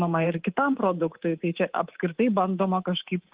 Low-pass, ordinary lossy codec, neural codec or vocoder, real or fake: 3.6 kHz; Opus, 16 kbps; codec, 24 kHz, 3.1 kbps, DualCodec; fake